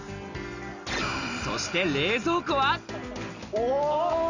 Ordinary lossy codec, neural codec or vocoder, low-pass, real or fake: none; none; 7.2 kHz; real